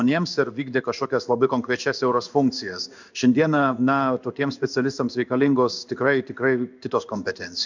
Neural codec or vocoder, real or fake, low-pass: codec, 16 kHz in and 24 kHz out, 1 kbps, XY-Tokenizer; fake; 7.2 kHz